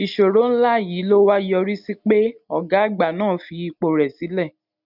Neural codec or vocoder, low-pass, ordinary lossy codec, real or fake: none; 5.4 kHz; none; real